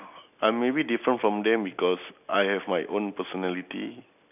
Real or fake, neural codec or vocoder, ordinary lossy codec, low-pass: real; none; none; 3.6 kHz